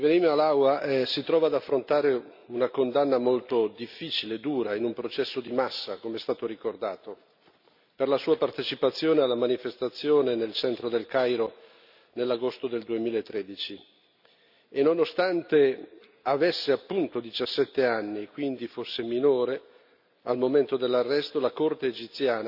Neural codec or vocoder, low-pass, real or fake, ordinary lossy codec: none; 5.4 kHz; real; none